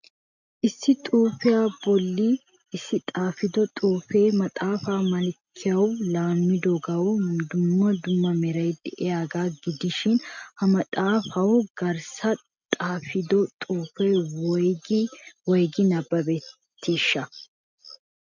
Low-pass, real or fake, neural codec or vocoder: 7.2 kHz; real; none